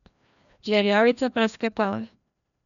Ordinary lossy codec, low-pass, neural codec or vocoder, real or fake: none; 7.2 kHz; codec, 16 kHz, 1 kbps, FreqCodec, larger model; fake